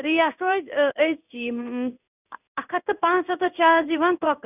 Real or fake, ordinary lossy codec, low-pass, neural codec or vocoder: fake; none; 3.6 kHz; codec, 16 kHz in and 24 kHz out, 1 kbps, XY-Tokenizer